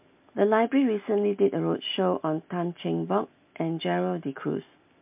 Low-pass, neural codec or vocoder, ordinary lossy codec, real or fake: 3.6 kHz; none; MP3, 32 kbps; real